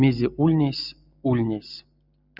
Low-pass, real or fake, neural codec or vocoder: 5.4 kHz; real; none